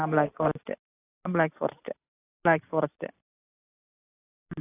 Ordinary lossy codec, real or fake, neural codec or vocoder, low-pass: AAC, 32 kbps; real; none; 3.6 kHz